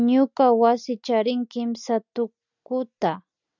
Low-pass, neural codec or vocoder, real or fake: 7.2 kHz; none; real